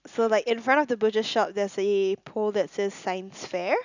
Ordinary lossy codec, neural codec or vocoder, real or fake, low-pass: none; none; real; 7.2 kHz